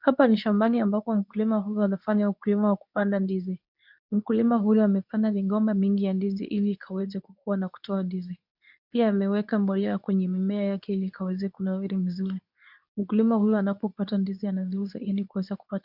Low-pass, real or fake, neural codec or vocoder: 5.4 kHz; fake; codec, 24 kHz, 0.9 kbps, WavTokenizer, medium speech release version 2